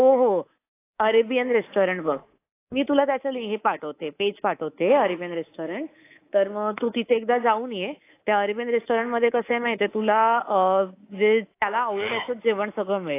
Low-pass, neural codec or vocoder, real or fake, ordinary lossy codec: 3.6 kHz; codec, 24 kHz, 3.1 kbps, DualCodec; fake; AAC, 24 kbps